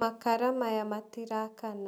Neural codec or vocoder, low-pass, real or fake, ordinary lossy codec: none; none; real; none